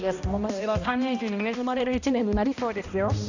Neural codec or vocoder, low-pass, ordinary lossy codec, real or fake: codec, 16 kHz, 1 kbps, X-Codec, HuBERT features, trained on balanced general audio; 7.2 kHz; none; fake